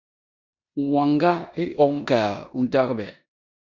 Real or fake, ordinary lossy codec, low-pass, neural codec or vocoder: fake; Opus, 64 kbps; 7.2 kHz; codec, 16 kHz in and 24 kHz out, 0.9 kbps, LongCat-Audio-Codec, four codebook decoder